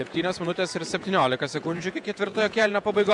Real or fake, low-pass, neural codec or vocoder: fake; 10.8 kHz; vocoder, 48 kHz, 128 mel bands, Vocos